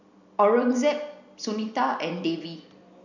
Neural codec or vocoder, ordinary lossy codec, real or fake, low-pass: none; none; real; 7.2 kHz